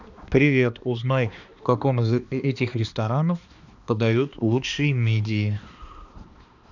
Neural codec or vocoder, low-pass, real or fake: codec, 16 kHz, 2 kbps, X-Codec, HuBERT features, trained on balanced general audio; 7.2 kHz; fake